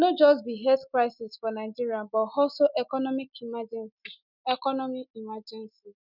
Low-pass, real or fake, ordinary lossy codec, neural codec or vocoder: 5.4 kHz; real; none; none